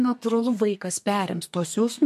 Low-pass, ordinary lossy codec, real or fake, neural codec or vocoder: 14.4 kHz; MP3, 64 kbps; fake; codec, 32 kHz, 1.9 kbps, SNAC